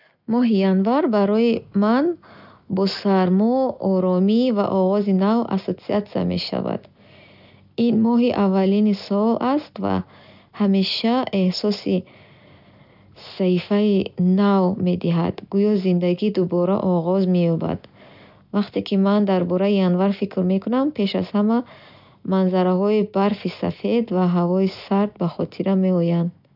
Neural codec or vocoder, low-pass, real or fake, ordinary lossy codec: none; 5.4 kHz; real; none